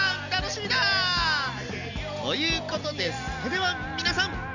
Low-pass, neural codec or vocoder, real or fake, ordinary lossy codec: 7.2 kHz; none; real; none